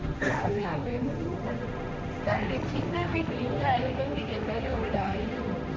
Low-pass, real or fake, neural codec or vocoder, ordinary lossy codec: none; fake; codec, 16 kHz, 1.1 kbps, Voila-Tokenizer; none